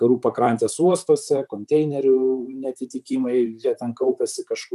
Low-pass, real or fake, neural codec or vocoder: 14.4 kHz; fake; vocoder, 44.1 kHz, 128 mel bands, Pupu-Vocoder